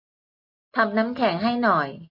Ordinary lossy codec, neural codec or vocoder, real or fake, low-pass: MP3, 32 kbps; none; real; 5.4 kHz